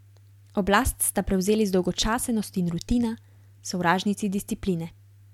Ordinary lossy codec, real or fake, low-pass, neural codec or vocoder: MP3, 96 kbps; real; 19.8 kHz; none